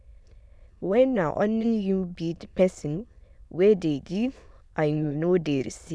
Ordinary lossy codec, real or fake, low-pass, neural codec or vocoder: none; fake; none; autoencoder, 22.05 kHz, a latent of 192 numbers a frame, VITS, trained on many speakers